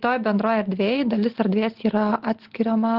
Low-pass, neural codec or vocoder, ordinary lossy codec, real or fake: 5.4 kHz; none; Opus, 16 kbps; real